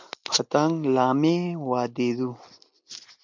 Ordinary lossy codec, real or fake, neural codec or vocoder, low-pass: MP3, 64 kbps; real; none; 7.2 kHz